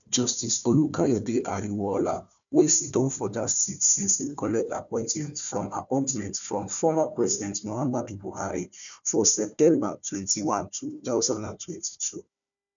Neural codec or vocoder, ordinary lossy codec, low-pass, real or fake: codec, 16 kHz, 1 kbps, FunCodec, trained on Chinese and English, 50 frames a second; none; 7.2 kHz; fake